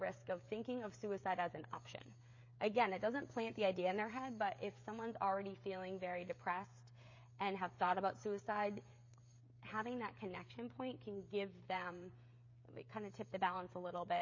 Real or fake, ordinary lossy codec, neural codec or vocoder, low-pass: fake; MP3, 32 kbps; codec, 16 kHz, 16 kbps, FreqCodec, smaller model; 7.2 kHz